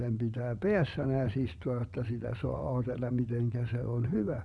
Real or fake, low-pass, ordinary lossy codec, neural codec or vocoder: fake; none; none; vocoder, 22.05 kHz, 80 mel bands, WaveNeXt